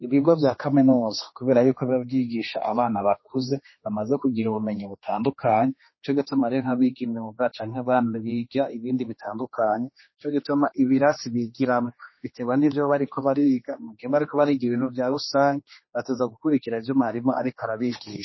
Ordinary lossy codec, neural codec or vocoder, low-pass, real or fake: MP3, 24 kbps; codec, 16 kHz, 2 kbps, X-Codec, HuBERT features, trained on general audio; 7.2 kHz; fake